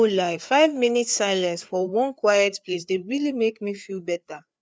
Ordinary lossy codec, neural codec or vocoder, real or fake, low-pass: none; codec, 16 kHz, 4 kbps, FreqCodec, larger model; fake; none